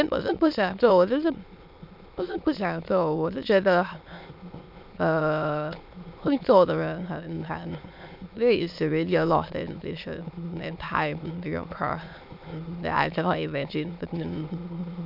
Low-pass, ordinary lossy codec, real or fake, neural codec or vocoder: 5.4 kHz; MP3, 48 kbps; fake; autoencoder, 22.05 kHz, a latent of 192 numbers a frame, VITS, trained on many speakers